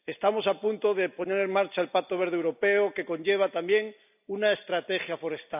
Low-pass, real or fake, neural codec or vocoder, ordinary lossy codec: 3.6 kHz; real; none; none